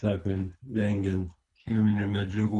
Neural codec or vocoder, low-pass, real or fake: codec, 24 kHz, 3 kbps, HILCodec; 10.8 kHz; fake